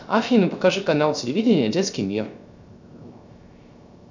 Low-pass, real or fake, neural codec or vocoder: 7.2 kHz; fake; codec, 16 kHz, 0.3 kbps, FocalCodec